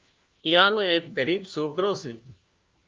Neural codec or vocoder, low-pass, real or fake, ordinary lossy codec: codec, 16 kHz, 1 kbps, FunCodec, trained on LibriTTS, 50 frames a second; 7.2 kHz; fake; Opus, 24 kbps